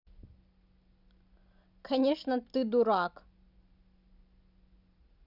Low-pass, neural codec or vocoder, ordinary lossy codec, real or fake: 5.4 kHz; none; none; real